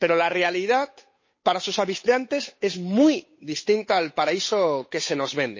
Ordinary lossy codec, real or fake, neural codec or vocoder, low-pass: MP3, 32 kbps; fake; codec, 16 kHz, 8 kbps, FunCodec, trained on Chinese and English, 25 frames a second; 7.2 kHz